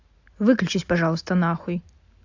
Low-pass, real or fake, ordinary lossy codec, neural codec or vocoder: 7.2 kHz; real; AAC, 48 kbps; none